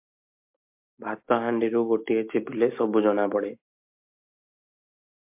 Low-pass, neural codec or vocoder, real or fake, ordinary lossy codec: 3.6 kHz; none; real; MP3, 32 kbps